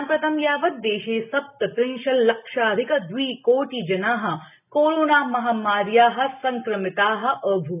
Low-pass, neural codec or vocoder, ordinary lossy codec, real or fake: 3.6 kHz; none; none; real